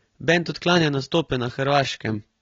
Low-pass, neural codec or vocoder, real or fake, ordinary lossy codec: 7.2 kHz; none; real; AAC, 24 kbps